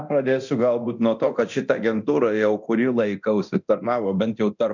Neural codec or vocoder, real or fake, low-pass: codec, 24 kHz, 0.9 kbps, DualCodec; fake; 7.2 kHz